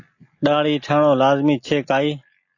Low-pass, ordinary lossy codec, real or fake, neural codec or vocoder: 7.2 kHz; AAC, 48 kbps; real; none